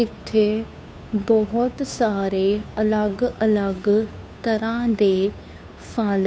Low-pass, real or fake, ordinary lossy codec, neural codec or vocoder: none; fake; none; codec, 16 kHz, 2 kbps, FunCodec, trained on Chinese and English, 25 frames a second